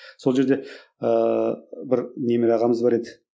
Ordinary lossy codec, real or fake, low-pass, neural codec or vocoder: none; real; none; none